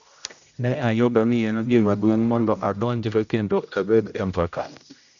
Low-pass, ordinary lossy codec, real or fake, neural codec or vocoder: 7.2 kHz; none; fake; codec, 16 kHz, 0.5 kbps, X-Codec, HuBERT features, trained on general audio